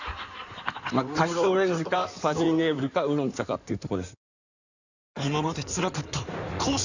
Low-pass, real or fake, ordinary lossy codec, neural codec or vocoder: 7.2 kHz; fake; none; codec, 16 kHz in and 24 kHz out, 2.2 kbps, FireRedTTS-2 codec